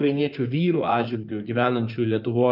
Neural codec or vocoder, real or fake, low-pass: codec, 16 kHz in and 24 kHz out, 2.2 kbps, FireRedTTS-2 codec; fake; 5.4 kHz